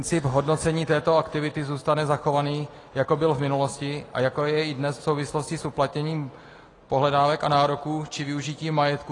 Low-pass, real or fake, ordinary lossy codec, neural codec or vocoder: 10.8 kHz; real; AAC, 32 kbps; none